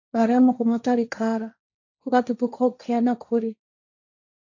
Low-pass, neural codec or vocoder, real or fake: 7.2 kHz; codec, 16 kHz, 1.1 kbps, Voila-Tokenizer; fake